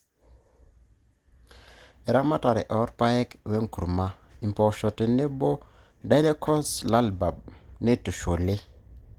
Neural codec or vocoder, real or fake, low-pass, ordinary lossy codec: none; real; 19.8 kHz; Opus, 24 kbps